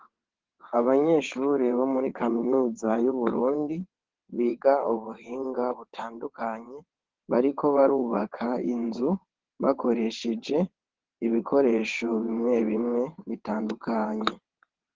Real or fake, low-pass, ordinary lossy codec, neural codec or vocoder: fake; 7.2 kHz; Opus, 16 kbps; vocoder, 22.05 kHz, 80 mel bands, WaveNeXt